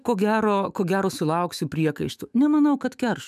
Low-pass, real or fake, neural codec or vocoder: 14.4 kHz; fake; autoencoder, 48 kHz, 128 numbers a frame, DAC-VAE, trained on Japanese speech